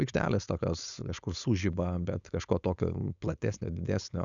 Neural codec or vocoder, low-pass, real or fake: codec, 16 kHz, 4.8 kbps, FACodec; 7.2 kHz; fake